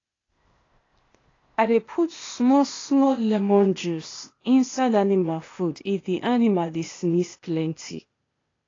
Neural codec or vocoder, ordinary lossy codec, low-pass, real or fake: codec, 16 kHz, 0.8 kbps, ZipCodec; AAC, 32 kbps; 7.2 kHz; fake